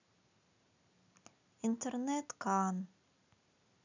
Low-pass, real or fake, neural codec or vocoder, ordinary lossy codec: 7.2 kHz; real; none; none